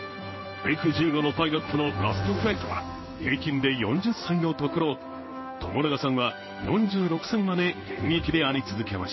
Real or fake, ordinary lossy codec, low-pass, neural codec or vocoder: fake; MP3, 24 kbps; 7.2 kHz; codec, 16 kHz in and 24 kHz out, 1 kbps, XY-Tokenizer